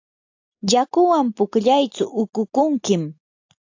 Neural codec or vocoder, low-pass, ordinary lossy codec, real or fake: none; 7.2 kHz; AAC, 48 kbps; real